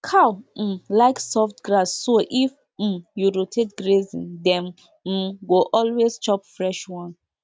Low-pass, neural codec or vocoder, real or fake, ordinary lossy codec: none; none; real; none